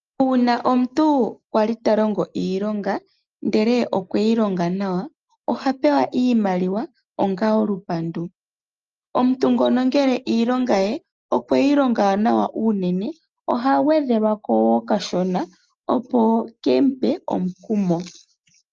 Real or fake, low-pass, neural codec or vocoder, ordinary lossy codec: real; 7.2 kHz; none; Opus, 24 kbps